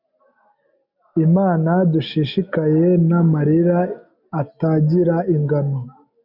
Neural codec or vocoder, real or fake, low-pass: none; real; 5.4 kHz